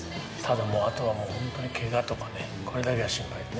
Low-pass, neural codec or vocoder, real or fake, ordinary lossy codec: none; none; real; none